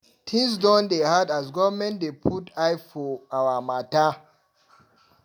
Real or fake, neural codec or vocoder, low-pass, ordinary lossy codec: real; none; 19.8 kHz; none